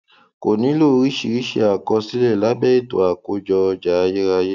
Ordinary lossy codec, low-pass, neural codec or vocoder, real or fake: none; 7.2 kHz; none; real